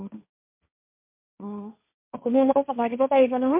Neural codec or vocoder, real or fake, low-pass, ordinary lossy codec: codec, 16 kHz, 1.1 kbps, Voila-Tokenizer; fake; 3.6 kHz; MP3, 32 kbps